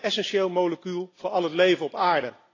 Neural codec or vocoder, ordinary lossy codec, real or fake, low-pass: none; AAC, 32 kbps; real; 7.2 kHz